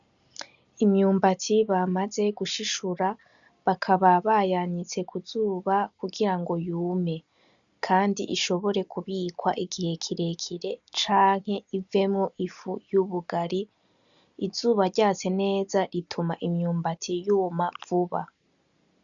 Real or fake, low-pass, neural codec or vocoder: real; 7.2 kHz; none